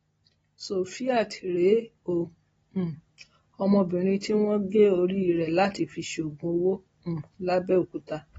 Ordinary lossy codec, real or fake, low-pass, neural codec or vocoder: AAC, 24 kbps; real; 19.8 kHz; none